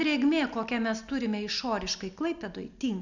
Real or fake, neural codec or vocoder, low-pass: real; none; 7.2 kHz